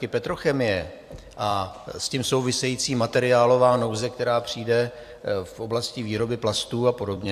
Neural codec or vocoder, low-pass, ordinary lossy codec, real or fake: vocoder, 44.1 kHz, 128 mel bands every 256 samples, BigVGAN v2; 14.4 kHz; AAC, 96 kbps; fake